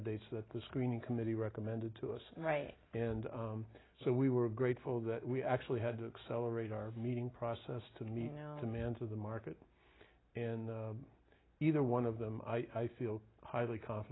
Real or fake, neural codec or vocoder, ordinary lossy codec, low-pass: real; none; AAC, 16 kbps; 7.2 kHz